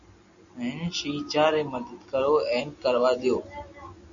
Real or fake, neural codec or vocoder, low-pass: real; none; 7.2 kHz